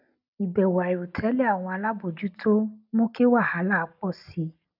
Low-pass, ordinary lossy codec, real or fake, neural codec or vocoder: 5.4 kHz; none; real; none